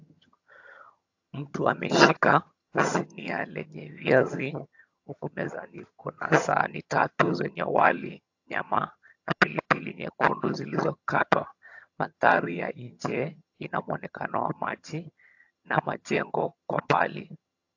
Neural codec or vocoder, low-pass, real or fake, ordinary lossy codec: vocoder, 22.05 kHz, 80 mel bands, HiFi-GAN; 7.2 kHz; fake; AAC, 48 kbps